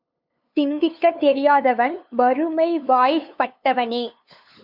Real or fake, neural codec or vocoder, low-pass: fake; codec, 16 kHz, 2 kbps, FunCodec, trained on LibriTTS, 25 frames a second; 5.4 kHz